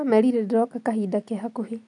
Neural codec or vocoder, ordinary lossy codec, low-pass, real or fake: none; none; 10.8 kHz; real